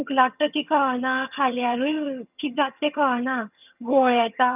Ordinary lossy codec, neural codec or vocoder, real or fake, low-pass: none; vocoder, 22.05 kHz, 80 mel bands, HiFi-GAN; fake; 3.6 kHz